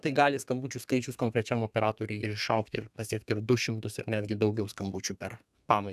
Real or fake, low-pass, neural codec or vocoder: fake; 14.4 kHz; codec, 32 kHz, 1.9 kbps, SNAC